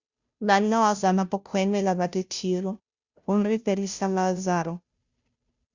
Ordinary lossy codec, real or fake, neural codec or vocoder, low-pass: Opus, 64 kbps; fake; codec, 16 kHz, 0.5 kbps, FunCodec, trained on Chinese and English, 25 frames a second; 7.2 kHz